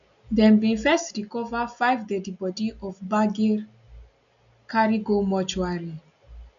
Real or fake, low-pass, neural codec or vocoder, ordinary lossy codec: real; 7.2 kHz; none; none